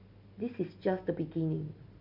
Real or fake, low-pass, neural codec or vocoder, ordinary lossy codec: real; 5.4 kHz; none; none